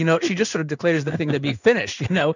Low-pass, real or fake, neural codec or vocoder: 7.2 kHz; fake; codec, 16 kHz in and 24 kHz out, 1 kbps, XY-Tokenizer